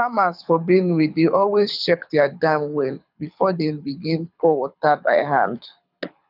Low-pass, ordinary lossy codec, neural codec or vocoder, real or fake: 5.4 kHz; none; codec, 24 kHz, 6 kbps, HILCodec; fake